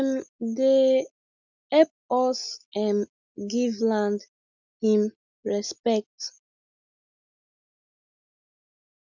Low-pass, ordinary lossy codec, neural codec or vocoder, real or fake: 7.2 kHz; none; none; real